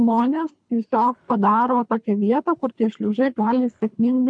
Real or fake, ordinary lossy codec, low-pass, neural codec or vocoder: fake; MP3, 64 kbps; 9.9 kHz; codec, 24 kHz, 3 kbps, HILCodec